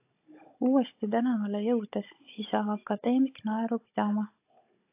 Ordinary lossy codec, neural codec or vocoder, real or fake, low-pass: AAC, 24 kbps; codec, 16 kHz, 16 kbps, FunCodec, trained on Chinese and English, 50 frames a second; fake; 3.6 kHz